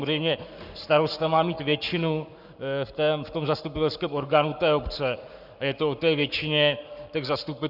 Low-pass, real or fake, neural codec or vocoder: 5.4 kHz; fake; codec, 44.1 kHz, 7.8 kbps, Pupu-Codec